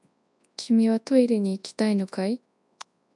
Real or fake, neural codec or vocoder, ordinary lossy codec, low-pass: fake; codec, 24 kHz, 0.9 kbps, WavTokenizer, large speech release; MP3, 96 kbps; 10.8 kHz